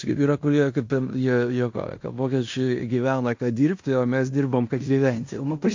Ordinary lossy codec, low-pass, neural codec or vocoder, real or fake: AAC, 48 kbps; 7.2 kHz; codec, 16 kHz in and 24 kHz out, 0.9 kbps, LongCat-Audio-Codec, fine tuned four codebook decoder; fake